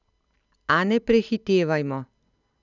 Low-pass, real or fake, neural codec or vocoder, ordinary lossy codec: 7.2 kHz; real; none; none